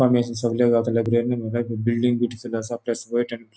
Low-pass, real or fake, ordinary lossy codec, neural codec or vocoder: none; real; none; none